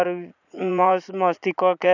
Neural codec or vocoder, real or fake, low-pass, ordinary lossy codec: vocoder, 44.1 kHz, 80 mel bands, Vocos; fake; 7.2 kHz; none